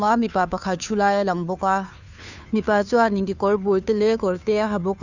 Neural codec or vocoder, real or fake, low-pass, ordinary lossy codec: codec, 16 kHz, 2 kbps, FunCodec, trained on Chinese and English, 25 frames a second; fake; 7.2 kHz; none